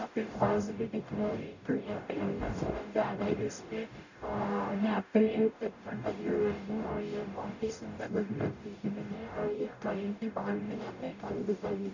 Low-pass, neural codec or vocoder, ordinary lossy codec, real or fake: 7.2 kHz; codec, 44.1 kHz, 0.9 kbps, DAC; none; fake